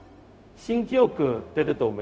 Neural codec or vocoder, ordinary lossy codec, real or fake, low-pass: codec, 16 kHz, 0.4 kbps, LongCat-Audio-Codec; none; fake; none